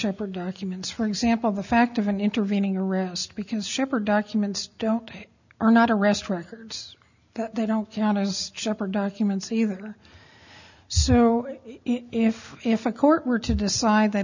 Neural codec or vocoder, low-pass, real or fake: none; 7.2 kHz; real